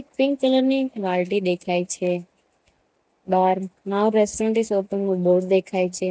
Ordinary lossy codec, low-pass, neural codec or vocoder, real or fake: none; none; none; real